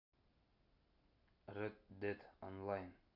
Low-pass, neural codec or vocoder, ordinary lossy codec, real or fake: 5.4 kHz; none; none; real